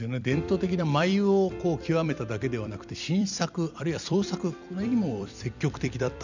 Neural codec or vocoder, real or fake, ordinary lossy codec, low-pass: vocoder, 44.1 kHz, 128 mel bands every 256 samples, BigVGAN v2; fake; none; 7.2 kHz